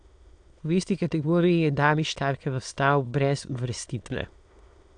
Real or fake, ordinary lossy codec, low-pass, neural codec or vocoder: fake; AAC, 64 kbps; 9.9 kHz; autoencoder, 22.05 kHz, a latent of 192 numbers a frame, VITS, trained on many speakers